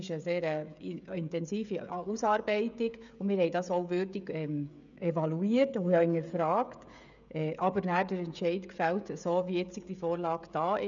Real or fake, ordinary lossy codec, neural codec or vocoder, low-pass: fake; none; codec, 16 kHz, 16 kbps, FreqCodec, smaller model; 7.2 kHz